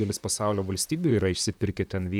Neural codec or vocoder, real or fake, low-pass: vocoder, 44.1 kHz, 128 mel bands, Pupu-Vocoder; fake; 19.8 kHz